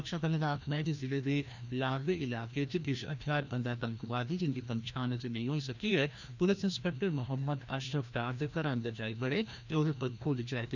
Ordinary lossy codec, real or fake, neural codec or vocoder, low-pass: none; fake; codec, 16 kHz, 1 kbps, FreqCodec, larger model; 7.2 kHz